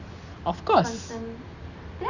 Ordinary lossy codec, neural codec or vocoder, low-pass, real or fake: none; none; 7.2 kHz; real